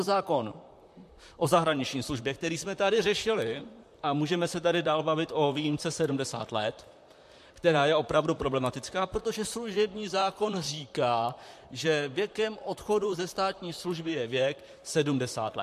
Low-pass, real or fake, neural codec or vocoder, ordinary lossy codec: 14.4 kHz; fake; vocoder, 44.1 kHz, 128 mel bands, Pupu-Vocoder; MP3, 64 kbps